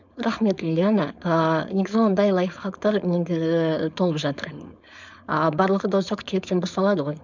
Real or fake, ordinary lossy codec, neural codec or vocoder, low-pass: fake; none; codec, 16 kHz, 4.8 kbps, FACodec; 7.2 kHz